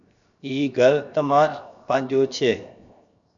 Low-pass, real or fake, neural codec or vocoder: 7.2 kHz; fake; codec, 16 kHz, 0.7 kbps, FocalCodec